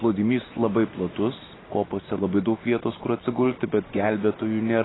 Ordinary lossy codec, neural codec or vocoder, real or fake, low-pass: AAC, 16 kbps; none; real; 7.2 kHz